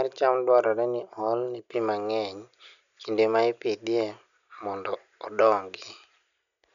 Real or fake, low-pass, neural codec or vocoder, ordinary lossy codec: real; 7.2 kHz; none; none